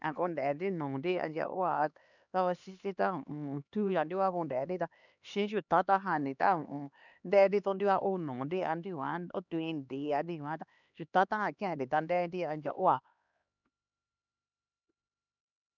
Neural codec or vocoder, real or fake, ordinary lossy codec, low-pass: codec, 16 kHz, 2 kbps, X-Codec, HuBERT features, trained on LibriSpeech; fake; none; 7.2 kHz